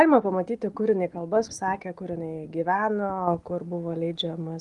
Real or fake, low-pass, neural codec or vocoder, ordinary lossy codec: real; 10.8 kHz; none; Opus, 24 kbps